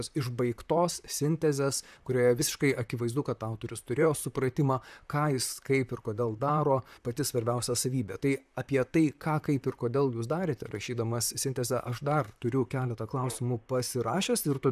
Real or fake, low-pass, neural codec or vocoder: fake; 14.4 kHz; vocoder, 44.1 kHz, 128 mel bands, Pupu-Vocoder